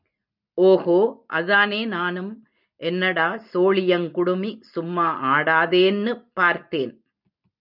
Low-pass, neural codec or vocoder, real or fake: 5.4 kHz; none; real